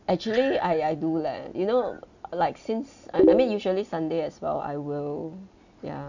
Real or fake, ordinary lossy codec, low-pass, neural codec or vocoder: real; none; 7.2 kHz; none